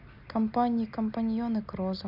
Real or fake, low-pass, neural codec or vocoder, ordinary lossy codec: real; 5.4 kHz; none; none